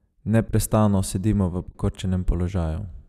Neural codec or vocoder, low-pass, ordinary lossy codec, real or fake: none; 14.4 kHz; none; real